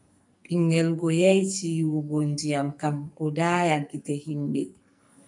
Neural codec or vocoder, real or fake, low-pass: codec, 44.1 kHz, 2.6 kbps, SNAC; fake; 10.8 kHz